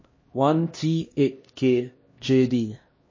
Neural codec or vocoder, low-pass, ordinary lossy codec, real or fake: codec, 16 kHz, 1 kbps, X-Codec, HuBERT features, trained on LibriSpeech; 7.2 kHz; MP3, 32 kbps; fake